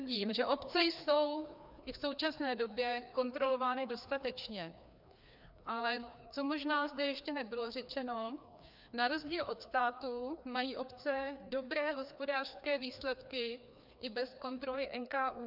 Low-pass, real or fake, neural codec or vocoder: 5.4 kHz; fake; codec, 16 kHz, 2 kbps, FreqCodec, larger model